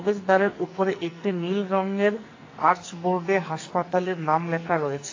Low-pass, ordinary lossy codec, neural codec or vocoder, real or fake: 7.2 kHz; AAC, 32 kbps; codec, 44.1 kHz, 2.6 kbps, SNAC; fake